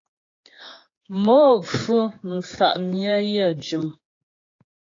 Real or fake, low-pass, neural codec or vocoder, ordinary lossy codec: fake; 7.2 kHz; codec, 16 kHz, 4 kbps, X-Codec, HuBERT features, trained on general audio; AAC, 32 kbps